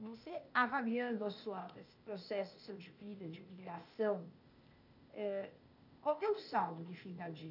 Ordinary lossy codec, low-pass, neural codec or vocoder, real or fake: none; 5.4 kHz; codec, 16 kHz, 0.8 kbps, ZipCodec; fake